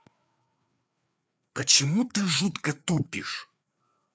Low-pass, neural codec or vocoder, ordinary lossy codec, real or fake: none; codec, 16 kHz, 4 kbps, FreqCodec, larger model; none; fake